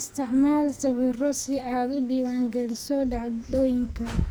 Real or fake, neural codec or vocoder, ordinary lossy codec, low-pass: fake; codec, 44.1 kHz, 2.6 kbps, SNAC; none; none